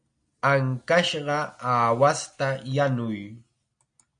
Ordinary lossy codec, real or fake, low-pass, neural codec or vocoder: MP3, 96 kbps; real; 9.9 kHz; none